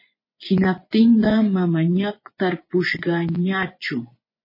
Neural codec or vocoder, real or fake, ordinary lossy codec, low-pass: vocoder, 24 kHz, 100 mel bands, Vocos; fake; MP3, 24 kbps; 5.4 kHz